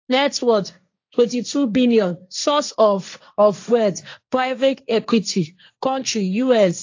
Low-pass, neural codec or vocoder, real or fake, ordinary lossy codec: 7.2 kHz; codec, 16 kHz, 1.1 kbps, Voila-Tokenizer; fake; AAC, 48 kbps